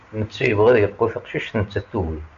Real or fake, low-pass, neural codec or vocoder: real; 7.2 kHz; none